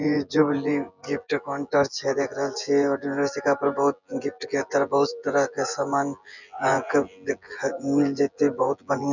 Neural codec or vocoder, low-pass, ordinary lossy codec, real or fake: vocoder, 24 kHz, 100 mel bands, Vocos; 7.2 kHz; none; fake